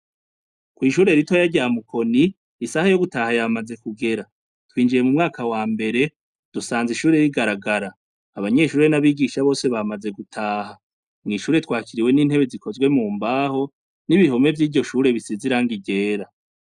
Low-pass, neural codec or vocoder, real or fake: 10.8 kHz; none; real